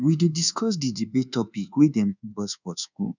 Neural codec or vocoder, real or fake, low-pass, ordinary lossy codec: codec, 24 kHz, 1.2 kbps, DualCodec; fake; 7.2 kHz; none